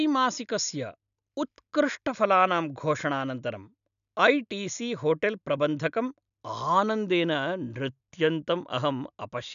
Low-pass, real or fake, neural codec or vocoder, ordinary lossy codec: 7.2 kHz; real; none; none